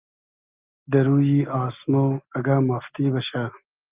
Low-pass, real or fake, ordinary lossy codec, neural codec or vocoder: 3.6 kHz; real; Opus, 32 kbps; none